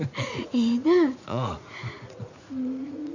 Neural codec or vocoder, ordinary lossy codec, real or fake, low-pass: vocoder, 44.1 kHz, 80 mel bands, Vocos; none; fake; 7.2 kHz